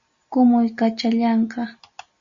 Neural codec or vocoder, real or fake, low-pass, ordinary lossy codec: none; real; 7.2 kHz; Opus, 64 kbps